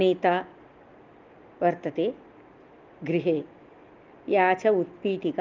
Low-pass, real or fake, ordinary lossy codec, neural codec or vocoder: 7.2 kHz; real; Opus, 32 kbps; none